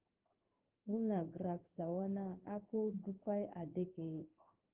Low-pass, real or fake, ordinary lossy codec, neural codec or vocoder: 3.6 kHz; fake; Opus, 16 kbps; codec, 16 kHz in and 24 kHz out, 1 kbps, XY-Tokenizer